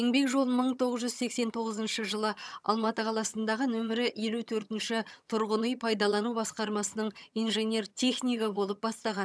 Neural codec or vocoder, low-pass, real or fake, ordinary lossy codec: vocoder, 22.05 kHz, 80 mel bands, HiFi-GAN; none; fake; none